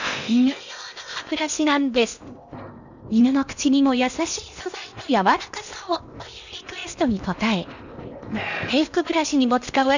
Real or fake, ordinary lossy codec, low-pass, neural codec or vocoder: fake; none; 7.2 kHz; codec, 16 kHz in and 24 kHz out, 0.8 kbps, FocalCodec, streaming, 65536 codes